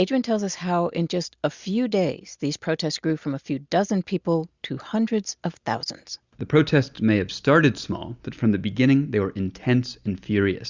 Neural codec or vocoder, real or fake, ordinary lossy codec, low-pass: none; real; Opus, 64 kbps; 7.2 kHz